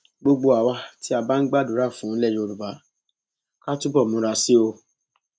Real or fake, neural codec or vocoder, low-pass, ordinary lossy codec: real; none; none; none